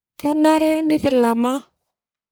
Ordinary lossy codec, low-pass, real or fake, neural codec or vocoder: none; none; fake; codec, 44.1 kHz, 1.7 kbps, Pupu-Codec